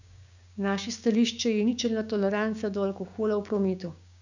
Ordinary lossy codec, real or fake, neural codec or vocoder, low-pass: none; fake; codec, 16 kHz, 6 kbps, DAC; 7.2 kHz